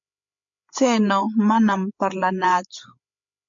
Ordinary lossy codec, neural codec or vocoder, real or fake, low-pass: MP3, 48 kbps; codec, 16 kHz, 8 kbps, FreqCodec, larger model; fake; 7.2 kHz